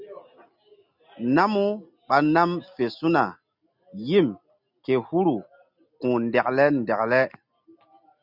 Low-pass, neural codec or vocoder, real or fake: 5.4 kHz; none; real